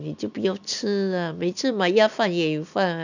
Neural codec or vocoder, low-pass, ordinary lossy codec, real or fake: none; 7.2 kHz; none; real